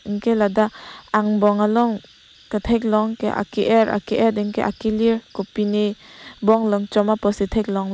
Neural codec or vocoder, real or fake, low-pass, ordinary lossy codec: none; real; none; none